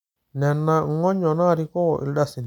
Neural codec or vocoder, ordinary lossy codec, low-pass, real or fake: none; none; 19.8 kHz; real